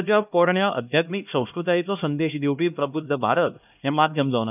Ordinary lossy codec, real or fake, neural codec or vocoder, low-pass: none; fake; codec, 16 kHz, 1 kbps, X-Codec, HuBERT features, trained on LibriSpeech; 3.6 kHz